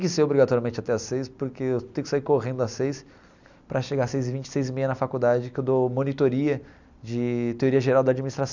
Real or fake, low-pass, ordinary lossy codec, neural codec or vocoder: real; 7.2 kHz; none; none